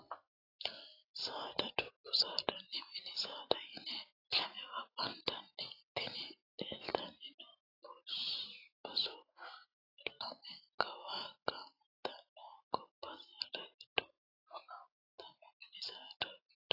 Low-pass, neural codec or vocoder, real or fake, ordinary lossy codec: 5.4 kHz; vocoder, 44.1 kHz, 128 mel bands every 512 samples, BigVGAN v2; fake; AAC, 24 kbps